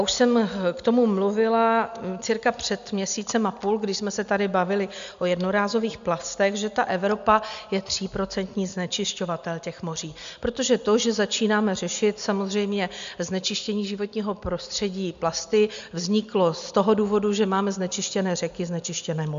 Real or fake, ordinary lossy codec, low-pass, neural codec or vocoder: real; MP3, 64 kbps; 7.2 kHz; none